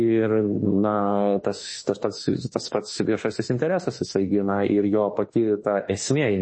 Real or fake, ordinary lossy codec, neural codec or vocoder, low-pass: fake; MP3, 32 kbps; autoencoder, 48 kHz, 32 numbers a frame, DAC-VAE, trained on Japanese speech; 9.9 kHz